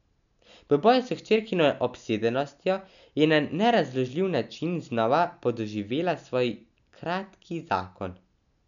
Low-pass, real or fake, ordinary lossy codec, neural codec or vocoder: 7.2 kHz; real; none; none